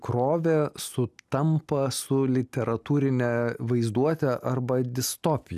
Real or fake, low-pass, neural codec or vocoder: real; 14.4 kHz; none